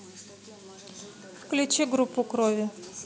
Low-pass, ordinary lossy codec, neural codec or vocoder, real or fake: none; none; none; real